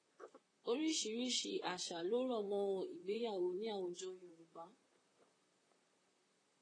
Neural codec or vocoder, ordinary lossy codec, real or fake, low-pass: vocoder, 44.1 kHz, 128 mel bands, Pupu-Vocoder; AAC, 32 kbps; fake; 9.9 kHz